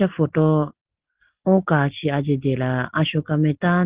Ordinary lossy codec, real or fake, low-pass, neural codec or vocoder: Opus, 16 kbps; real; 3.6 kHz; none